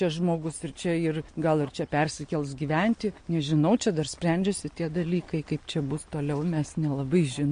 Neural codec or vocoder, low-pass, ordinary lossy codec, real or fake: none; 9.9 kHz; MP3, 48 kbps; real